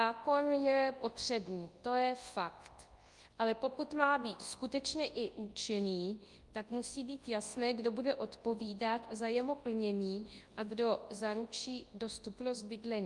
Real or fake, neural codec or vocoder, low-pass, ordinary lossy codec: fake; codec, 24 kHz, 0.9 kbps, WavTokenizer, large speech release; 10.8 kHz; Opus, 32 kbps